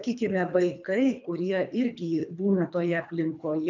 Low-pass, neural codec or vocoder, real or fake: 7.2 kHz; codec, 24 kHz, 3 kbps, HILCodec; fake